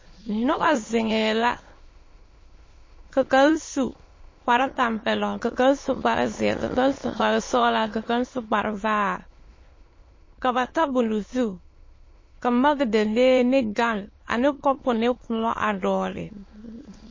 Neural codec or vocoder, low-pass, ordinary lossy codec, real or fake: autoencoder, 22.05 kHz, a latent of 192 numbers a frame, VITS, trained on many speakers; 7.2 kHz; MP3, 32 kbps; fake